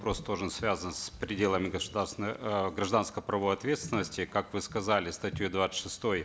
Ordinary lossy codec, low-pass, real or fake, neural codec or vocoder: none; none; real; none